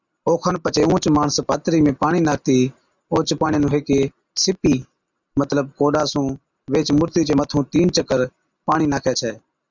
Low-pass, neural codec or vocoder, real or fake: 7.2 kHz; none; real